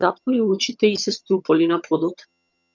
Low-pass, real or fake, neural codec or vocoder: 7.2 kHz; fake; vocoder, 22.05 kHz, 80 mel bands, HiFi-GAN